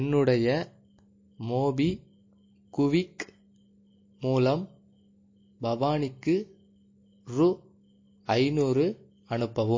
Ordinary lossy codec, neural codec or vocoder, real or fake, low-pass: MP3, 32 kbps; none; real; 7.2 kHz